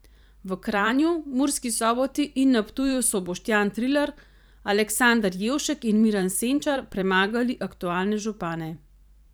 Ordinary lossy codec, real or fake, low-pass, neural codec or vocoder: none; fake; none; vocoder, 44.1 kHz, 128 mel bands every 512 samples, BigVGAN v2